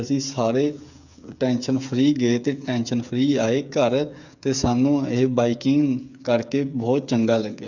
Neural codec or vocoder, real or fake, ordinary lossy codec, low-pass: codec, 16 kHz, 8 kbps, FreqCodec, smaller model; fake; none; 7.2 kHz